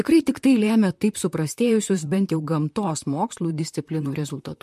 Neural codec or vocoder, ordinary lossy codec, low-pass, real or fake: vocoder, 44.1 kHz, 128 mel bands, Pupu-Vocoder; MP3, 64 kbps; 14.4 kHz; fake